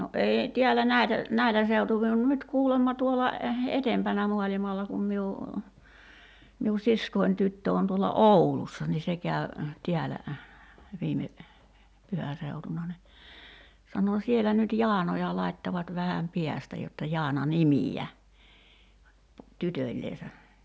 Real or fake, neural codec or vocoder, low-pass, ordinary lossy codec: real; none; none; none